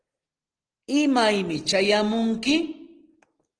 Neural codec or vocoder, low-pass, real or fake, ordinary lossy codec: none; 9.9 kHz; real; Opus, 16 kbps